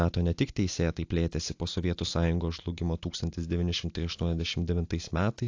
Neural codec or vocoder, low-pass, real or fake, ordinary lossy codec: none; 7.2 kHz; real; AAC, 48 kbps